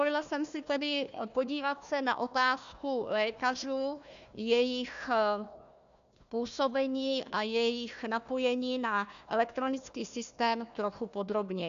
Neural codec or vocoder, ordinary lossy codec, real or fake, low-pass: codec, 16 kHz, 1 kbps, FunCodec, trained on Chinese and English, 50 frames a second; AAC, 96 kbps; fake; 7.2 kHz